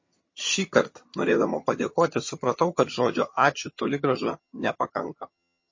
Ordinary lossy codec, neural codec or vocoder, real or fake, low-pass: MP3, 32 kbps; vocoder, 22.05 kHz, 80 mel bands, HiFi-GAN; fake; 7.2 kHz